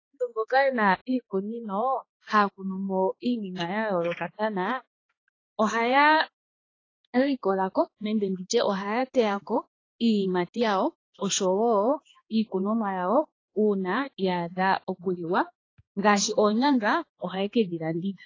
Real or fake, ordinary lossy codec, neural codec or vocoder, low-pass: fake; AAC, 32 kbps; codec, 16 kHz, 2 kbps, X-Codec, HuBERT features, trained on balanced general audio; 7.2 kHz